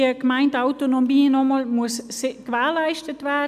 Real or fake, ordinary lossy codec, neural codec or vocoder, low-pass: real; none; none; 14.4 kHz